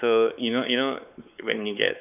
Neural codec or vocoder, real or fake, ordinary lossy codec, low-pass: codec, 16 kHz, 4 kbps, X-Codec, WavLM features, trained on Multilingual LibriSpeech; fake; none; 3.6 kHz